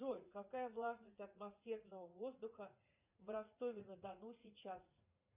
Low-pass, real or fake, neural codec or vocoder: 3.6 kHz; fake; vocoder, 44.1 kHz, 80 mel bands, Vocos